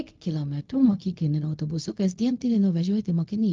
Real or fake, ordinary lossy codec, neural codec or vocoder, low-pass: fake; Opus, 32 kbps; codec, 16 kHz, 0.4 kbps, LongCat-Audio-Codec; 7.2 kHz